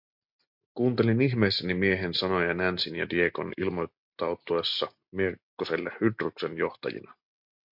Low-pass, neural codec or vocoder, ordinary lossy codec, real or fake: 5.4 kHz; none; MP3, 48 kbps; real